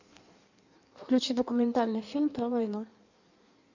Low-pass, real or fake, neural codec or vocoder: 7.2 kHz; fake; codec, 16 kHz in and 24 kHz out, 1.1 kbps, FireRedTTS-2 codec